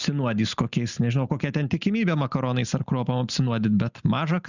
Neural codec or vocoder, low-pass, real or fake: none; 7.2 kHz; real